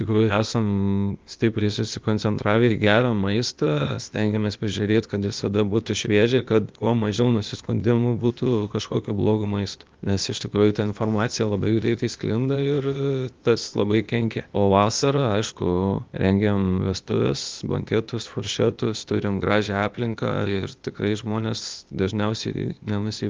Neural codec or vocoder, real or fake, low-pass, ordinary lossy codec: codec, 16 kHz, 0.8 kbps, ZipCodec; fake; 7.2 kHz; Opus, 24 kbps